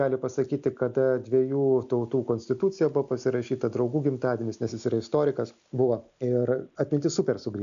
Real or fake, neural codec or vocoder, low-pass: real; none; 7.2 kHz